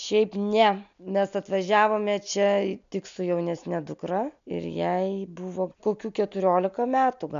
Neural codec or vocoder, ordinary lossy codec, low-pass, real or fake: none; MP3, 64 kbps; 7.2 kHz; real